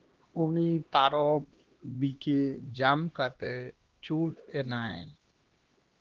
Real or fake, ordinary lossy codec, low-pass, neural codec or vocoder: fake; Opus, 16 kbps; 7.2 kHz; codec, 16 kHz, 1 kbps, X-Codec, HuBERT features, trained on LibriSpeech